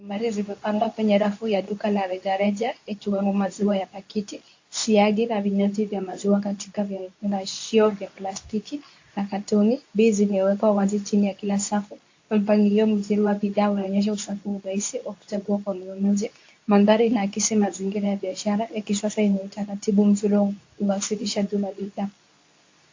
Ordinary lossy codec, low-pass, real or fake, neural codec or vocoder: AAC, 48 kbps; 7.2 kHz; fake; codec, 24 kHz, 0.9 kbps, WavTokenizer, medium speech release version 2